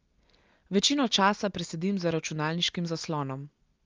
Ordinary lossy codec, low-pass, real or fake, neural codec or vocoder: Opus, 32 kbps; 7.2 kHz; real; none